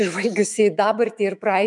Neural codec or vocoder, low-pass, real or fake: autoencoder, 48 kHz, 128 numbers a frame, DAC-VAE, trained on Japanese speech; 10.8 kHz; fake